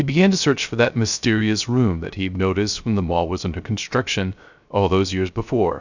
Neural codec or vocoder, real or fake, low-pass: codec, 16 kHz, 0.3 kbps, FocalCodec; fake; 7.2 kHz